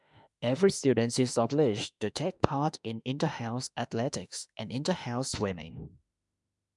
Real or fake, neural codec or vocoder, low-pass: fake; autoencoder, 48 kHz, 32 numbers a frame, DAC-VAE, trained on Japanese speech; 10.8 kHz